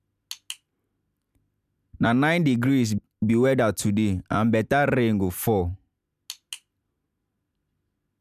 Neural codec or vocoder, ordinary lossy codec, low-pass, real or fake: none; none; 14.4 kHz; real